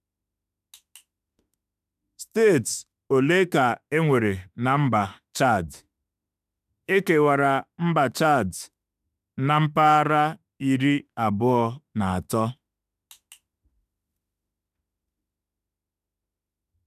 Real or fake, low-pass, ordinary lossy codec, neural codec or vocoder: fake; 14.4 kHz; none; autoencoder, 48 kHz, 32 numbers a frame, DAC-VAE, trained on Japanese speech